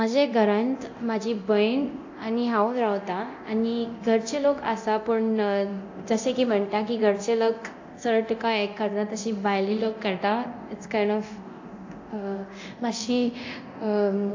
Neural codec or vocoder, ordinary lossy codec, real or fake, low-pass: codec, 24 kHz, 0.9 kbps, DualCodec; AAC, 48 kbps; fake; 7.2 kHz